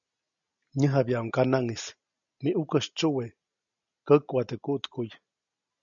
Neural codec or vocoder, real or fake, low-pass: none; real; 7.2 kHz